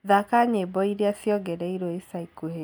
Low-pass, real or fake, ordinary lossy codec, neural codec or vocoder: none; real; none; none